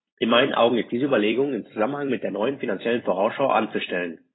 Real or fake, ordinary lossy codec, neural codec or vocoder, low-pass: fake; AAC, 16 kbps; vocoder, 44.1 kHz, 128 mel bands every 256 samples, BigVGAN v2; 7.2 kHz